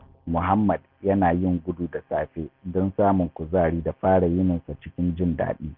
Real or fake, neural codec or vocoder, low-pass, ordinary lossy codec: real; none; 5.4 kHz; none